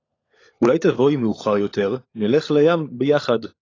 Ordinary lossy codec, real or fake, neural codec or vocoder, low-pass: AAC, 32 kbps; fake; codec, 16 kHz, 16 kbps, FunCodec, trained on LibriTTS, 50 frames a second; 7.2 kHz